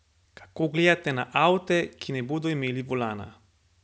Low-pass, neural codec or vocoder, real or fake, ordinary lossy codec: none; none; real; none